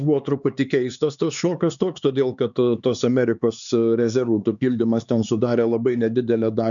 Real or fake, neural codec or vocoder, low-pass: fake; codec, 16 kHz, 4 kbps, X-Codec, HuBERT features, trained on LibriSpeech; 7.2 kHz